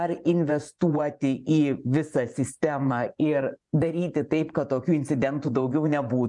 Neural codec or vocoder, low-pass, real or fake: vocoder, 24 kHz, 100 mel bands, Vocos; 10.8 kHz; fake